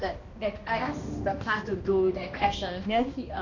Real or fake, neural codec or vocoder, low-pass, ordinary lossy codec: fake; codec, 16 kHz, 1 kbps, X-Codec, HuBERT features, trained on balanced general audio; 7.2 kHz; none